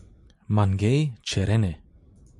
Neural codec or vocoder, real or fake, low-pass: none; real; 10.8 kHz